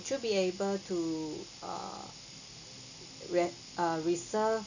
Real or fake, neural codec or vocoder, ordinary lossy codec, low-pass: real; none; none; 7.2 kHz